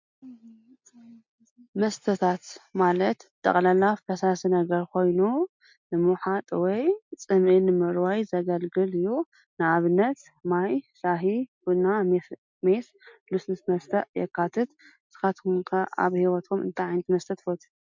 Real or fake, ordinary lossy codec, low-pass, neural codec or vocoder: real; MP3, 64 kbps; 7.2 kHz; none